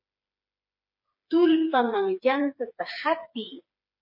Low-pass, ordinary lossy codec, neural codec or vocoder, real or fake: 5.4 kHz; MP3, 32 kbps; codec, 16 kHz, 4 kbps, FreqCodec, smaller model; fake